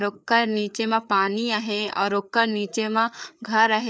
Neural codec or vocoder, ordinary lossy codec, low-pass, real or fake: codec, 16 kHz, 4 kbps, FreqCodec, larger model; none; none; fake